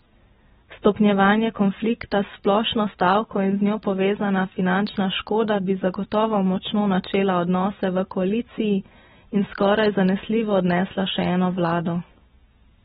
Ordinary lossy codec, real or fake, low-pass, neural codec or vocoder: AAC, 16 kbps; real; 19.8 kHz; none